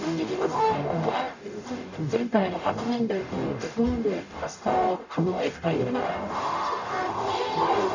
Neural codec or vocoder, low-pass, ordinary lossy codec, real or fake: codec, 44.1 kHz, 0.9 kbps, DAC; 7.2 kHz; none; fake